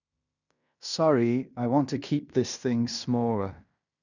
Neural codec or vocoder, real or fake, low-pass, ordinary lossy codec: codec, 16 kHz in and 24 kHz out, 0.9 kbps, LongCat-Audio-Codec, fine tuned four codebook decoder; fake; 7.2 kHz; none